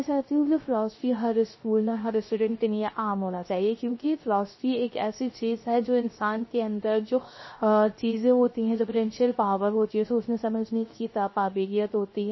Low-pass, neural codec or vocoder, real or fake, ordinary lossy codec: 7.2 kHz; codec, 16 kHz, 0.3 kbps, FocalCodec; fake; MP3, 24 kbps